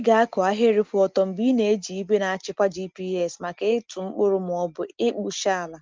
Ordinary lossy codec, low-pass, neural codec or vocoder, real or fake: Opus, 24 kbps; 7.2 kHz; none; real